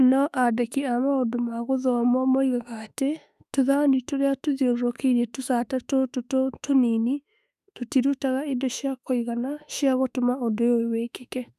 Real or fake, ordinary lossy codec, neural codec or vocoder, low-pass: fake; none; autoencoder, 48 kHz, 32 numbers a frame, DAC-VAE, trained on Japanese speech; 14.4 kHz